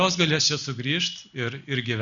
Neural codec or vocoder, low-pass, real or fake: none; 7.2 kHz; real